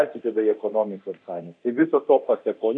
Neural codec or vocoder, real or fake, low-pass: codec, 24 kHz, 0.9 kbps, DualCodec; fake; 9.9 kHz